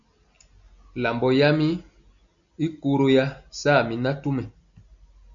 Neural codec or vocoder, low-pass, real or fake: none; 7.2 kHz; real